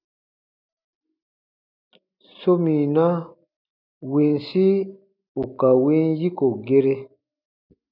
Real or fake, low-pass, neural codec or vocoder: real; 5.4 kHz; none